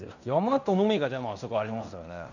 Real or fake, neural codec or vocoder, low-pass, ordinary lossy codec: fake; codec, 16 kHz in and 24 kHz out, 0.9 kbps, LongCat-Audio-Codec, fine tuned four codebook decoder; 7.2 kHz; none